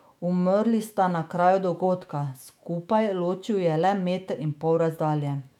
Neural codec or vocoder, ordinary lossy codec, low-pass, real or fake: autoencoder, 48 kHz, 128 numbers a frame, DAC-VAE, trained on Japanese speech; none; 19.8 kHz; fake